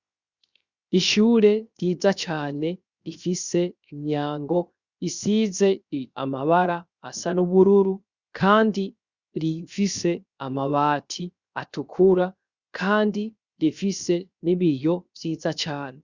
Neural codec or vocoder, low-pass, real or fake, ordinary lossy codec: codec, 16 kHz, 0.7 kbps, FocalCodec; 7.2 kHz; fake; Opus, 64 kbps